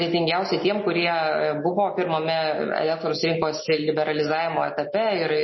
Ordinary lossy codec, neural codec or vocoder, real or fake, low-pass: MP3, 24 kbps; none; real; 7.2 kHz